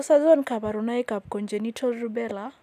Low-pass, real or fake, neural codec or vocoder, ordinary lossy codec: 14.4 kHz; real; none; none